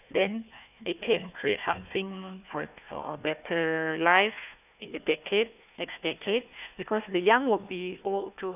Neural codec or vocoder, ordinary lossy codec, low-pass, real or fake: codec, 16 kHz, 1 kbps, FunCodec, trained on Chinese and English, 50 frames a second; none; 3.6 kHz; fake